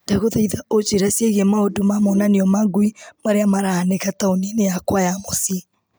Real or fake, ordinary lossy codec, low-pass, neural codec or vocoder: real; none; none; none